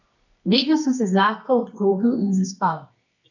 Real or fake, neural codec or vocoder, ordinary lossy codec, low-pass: fake; codec, 24 kHz, 0.9 kbps, WavTokenizer, medium music audio release; none; 7.2 kHz